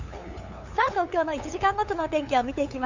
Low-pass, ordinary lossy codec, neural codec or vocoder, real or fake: 7.2 kHz; Opus, 64 kbps; codec, 16 kHz, 8 kbps, FunCodec, trained on LibriTTS, 25 frames a second; fake